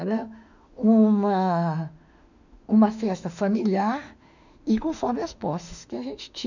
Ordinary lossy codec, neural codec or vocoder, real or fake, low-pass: none; autoencoder, 48 kHz, 32 numbers a frame, DAC-VAE, trained on Japanese speech; fake; 7.2 kHz